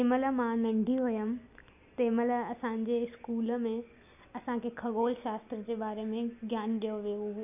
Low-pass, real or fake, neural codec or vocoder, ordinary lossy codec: 3.6 kHz; real; none; none